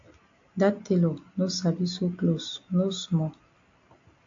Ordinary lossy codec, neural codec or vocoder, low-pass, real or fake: AAC, 48 kbps; none; 7.2 kHz; real